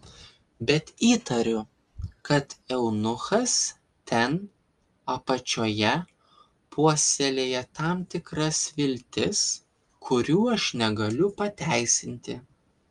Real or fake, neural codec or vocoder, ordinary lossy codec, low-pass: real; none; Opus, 32 kbps; 10.8 kHz